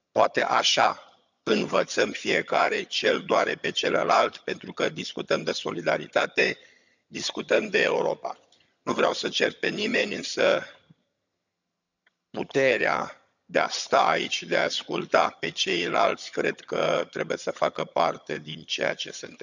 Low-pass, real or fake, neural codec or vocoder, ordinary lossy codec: 7.2 kHz; fake; vocoder, 22.05 kHz, 80 mel bands, HiFi-GAN; none